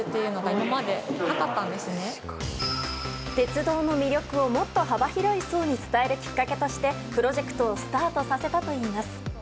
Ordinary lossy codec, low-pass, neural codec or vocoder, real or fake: none; none; none; real